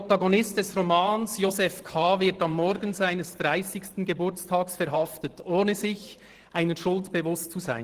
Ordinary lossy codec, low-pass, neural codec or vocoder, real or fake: Opus, 16 kbps; 14.4 kHz; vocoder, 44.1 kHz, 128 mel bands every 512 samples, BigVGAN v2; fake